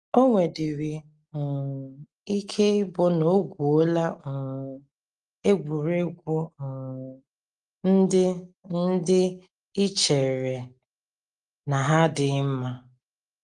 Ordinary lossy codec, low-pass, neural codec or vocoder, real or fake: Opus, 24 kbps; 10.8 kHz; none; real